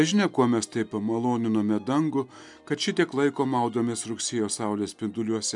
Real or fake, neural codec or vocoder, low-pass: real; none; 10.8 kHz